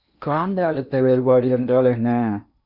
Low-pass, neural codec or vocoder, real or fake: 5.4 kHz; codec, 16 kHz in and 24 kHz out, 0.8 kbps, FocalCodec, streaming, 65536 codes; fake